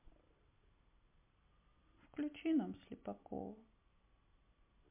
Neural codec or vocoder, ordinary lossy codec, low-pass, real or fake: none; MP3, 24 kbps; 3.6 kHz; real